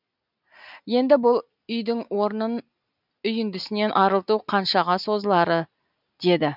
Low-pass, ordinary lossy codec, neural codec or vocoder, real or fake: 5.4 kHz; none; none; real